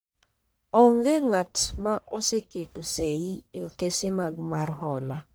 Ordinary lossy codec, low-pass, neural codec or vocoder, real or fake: none; none; codec, 44.1 kHz, 1.7 kbps, Pupu-Codec; fake